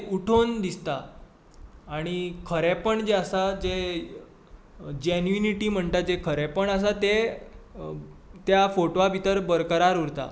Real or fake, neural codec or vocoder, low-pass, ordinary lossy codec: real; none; none; none